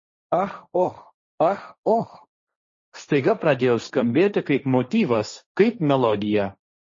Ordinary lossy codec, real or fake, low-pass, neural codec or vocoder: MP3, 32 kbps; fake; 7.2 kHz; codec, 16 kHz, 1.1 kbps, Voila-Tokenizer